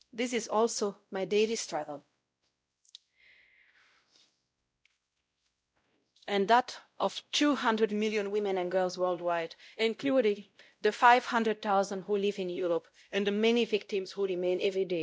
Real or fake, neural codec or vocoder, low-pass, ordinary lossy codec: fake; codec, 16 kHz, 0.5 kbps, X-Codec, WavLM features, trained on Multilingual LibriSpeech; none; none